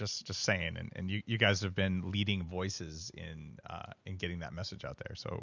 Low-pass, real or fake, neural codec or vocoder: 7.2 kHz; real; none